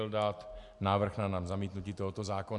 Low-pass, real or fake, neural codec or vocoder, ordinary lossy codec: 10.8 kHz; real; none; MP3, 64 kbps